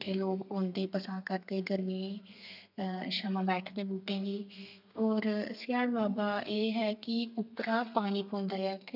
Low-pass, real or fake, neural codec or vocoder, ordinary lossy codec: 5.4 kHz; fake; codec, 32 kHz, 1.9 kbps, SNAC; none